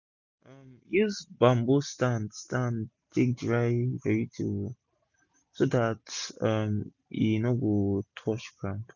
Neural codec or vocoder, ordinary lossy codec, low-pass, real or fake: none; none; 7.2 kHz; real